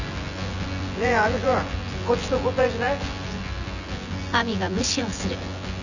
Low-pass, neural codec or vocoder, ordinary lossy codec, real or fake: 7.2 kHz; vocoder, 24 kHz, 100 mel bands, Vocos; none; fake